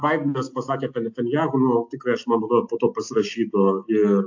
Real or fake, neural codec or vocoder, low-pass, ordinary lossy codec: real; none; 7.2 kHz; AAC, 48 kbps